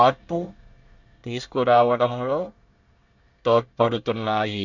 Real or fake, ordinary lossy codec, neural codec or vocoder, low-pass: fake; none; codec, 24 kHz, 1 kbps, SNAC; 7.2 kHz